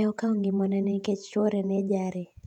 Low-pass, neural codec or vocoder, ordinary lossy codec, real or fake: 19.8 kHz; vocoder, 48 kHz, 128 mel bands, Vocos; none; fake